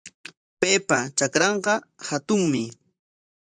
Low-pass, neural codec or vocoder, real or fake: 9.9 kHz; vocoder, 44.1 kHz, 128 mel bands, Pupu-Vocoder; fake